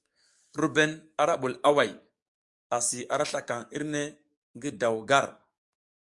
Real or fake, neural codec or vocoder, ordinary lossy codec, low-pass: fake; codec, 44.1 kHz, 7.8 kbps, DAC; Opus, 64 kbps; 10.8 kHz